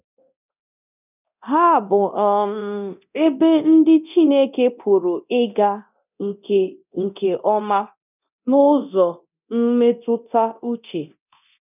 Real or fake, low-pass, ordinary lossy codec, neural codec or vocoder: fake; 3.6 kHz; none; codec, 24 kHz, 0.9 kbps, DualCodec